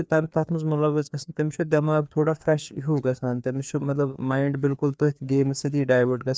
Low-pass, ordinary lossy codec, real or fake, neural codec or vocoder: none; none; fake; codec, 16 kHz, 2 kbps, FreqCodec, larger model